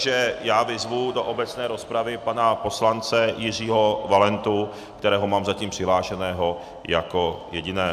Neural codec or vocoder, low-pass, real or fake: none; 14.4 kHz; real